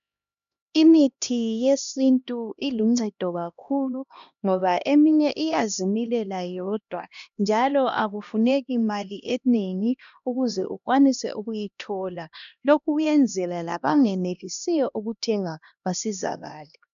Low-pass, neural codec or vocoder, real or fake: 7.2 kHz; codec, 16 kHz, 1 kbps, X-Codec, HuBERT features, trained on LibriSpeech; fake